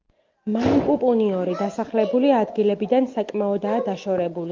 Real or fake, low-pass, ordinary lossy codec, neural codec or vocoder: real; 7.2 kHz; Opus, 24 kbps; none